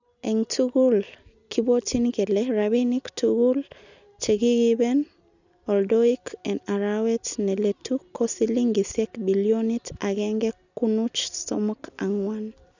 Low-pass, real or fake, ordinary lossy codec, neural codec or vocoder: 7.2 kHz; real; none; none